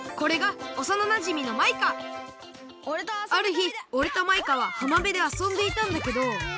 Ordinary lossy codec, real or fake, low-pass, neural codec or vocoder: none; real; none; none